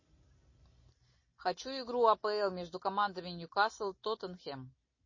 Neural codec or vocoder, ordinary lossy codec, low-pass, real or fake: none; MP3, 32 kbps; 7.2 kHz; real